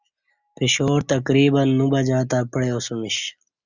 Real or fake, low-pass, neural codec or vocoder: real; 7.2 kHz; none